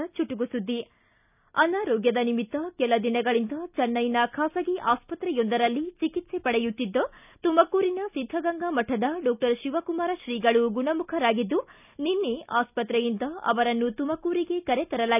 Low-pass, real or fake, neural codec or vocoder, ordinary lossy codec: 3.6 kHz; real; none; none